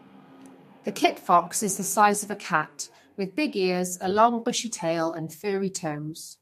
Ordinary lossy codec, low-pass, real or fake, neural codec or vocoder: MP3, 64 kbps; 14.4 kHz; fake; codec, 32 kHz, 1.9 kbps, SNAC